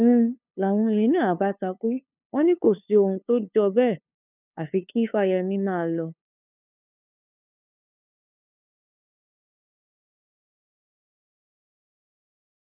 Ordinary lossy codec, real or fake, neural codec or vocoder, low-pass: none; fake; codec, 16 kHz, 2 kbps, FunCodec, trained on LibriTTS, 25 frames a second; 3.6 kHz